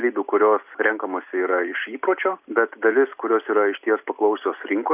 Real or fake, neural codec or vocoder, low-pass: real; none; 3.6 kHz